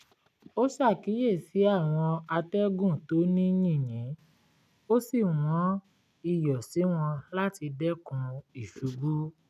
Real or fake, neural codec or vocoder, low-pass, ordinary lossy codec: real; none; 14.4 kHz; none